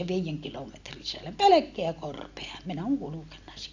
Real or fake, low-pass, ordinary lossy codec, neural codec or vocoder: real; 7.2 kHz; AAC, 48 kbps; none